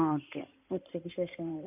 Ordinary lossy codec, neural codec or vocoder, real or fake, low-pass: none; codec, 16 kHz in and 24 kHz out, 2.2 kbps, FireRedTTS-2 codec; fake; 3.6 kHz